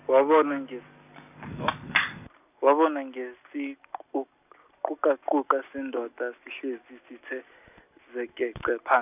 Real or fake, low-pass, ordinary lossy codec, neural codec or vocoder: real; 3.6 kHz; none; none